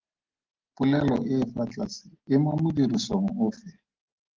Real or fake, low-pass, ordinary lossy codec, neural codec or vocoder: real; 7.2 kHz; Opus, 16 kbps; none